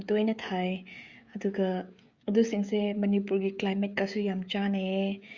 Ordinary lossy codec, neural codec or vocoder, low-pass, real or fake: Opus, 64 kbps; codec, 16 kHz, 16 kbps, FreqCodec, smaller model; 7.2 kHz; fake